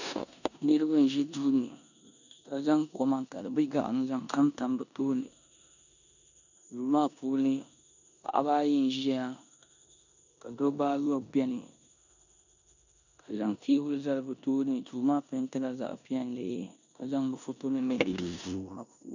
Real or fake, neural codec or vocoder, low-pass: fake; codec, 16 kHz in and 24 kHz out, 0.9 kbps, LongCat-Audio-Codec, four codebook decoder; 7.2 kHz